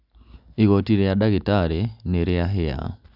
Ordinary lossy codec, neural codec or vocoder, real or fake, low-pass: none; none; real; 5.4 kHz